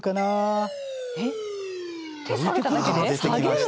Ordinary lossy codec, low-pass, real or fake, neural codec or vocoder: none; none; real; none